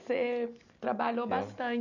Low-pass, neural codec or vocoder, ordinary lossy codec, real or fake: 7.2 kHz; none; none; real